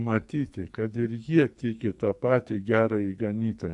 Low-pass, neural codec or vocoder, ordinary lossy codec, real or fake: 10.8 kHz; codec, 44.1 kHz, 2.6 kbps, SNAC; AAC, 64 kbps; fake